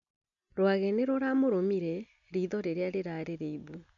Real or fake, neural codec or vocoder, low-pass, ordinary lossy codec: real; none; 7.2 kHz; none